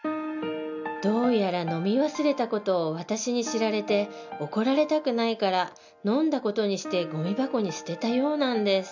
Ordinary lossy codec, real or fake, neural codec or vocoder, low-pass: none; real; none; 7.2 kHz